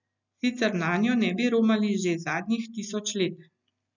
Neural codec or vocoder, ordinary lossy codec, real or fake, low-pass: none; none; real; 7.2 kHz